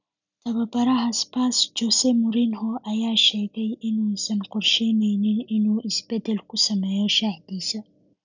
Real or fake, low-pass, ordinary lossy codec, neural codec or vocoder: real; 7.2 kHz; none; none